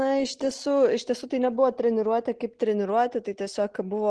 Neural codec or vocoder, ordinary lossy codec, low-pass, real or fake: none; Opus, 16 kbps; 10.8 kHz; real